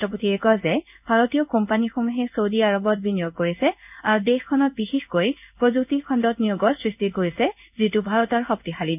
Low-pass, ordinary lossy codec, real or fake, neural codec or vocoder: 3.6 kHz; none; fake; codec, 16 kHz in and 24 kHz out, 1 kbps, XY-Tokenizer